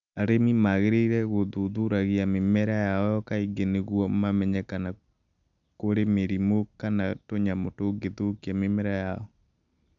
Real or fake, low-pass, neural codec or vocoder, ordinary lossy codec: real; 7.2 kHz; none; none